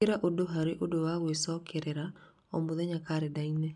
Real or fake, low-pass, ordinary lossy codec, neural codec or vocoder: real; 10.8 kHz; none; none